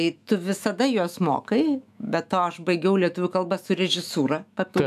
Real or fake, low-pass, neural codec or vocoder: fake; 14.4 kHz; autoencoder, 48 kHz, 128 numbers a frame, DAC-VAE, trained on Japanese speech